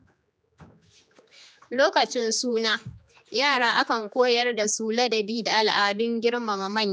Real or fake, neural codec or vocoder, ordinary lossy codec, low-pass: fake; codec, 16 kHz, 2 kbps, X-Codec, HuBERT features, trained on general audio; none; none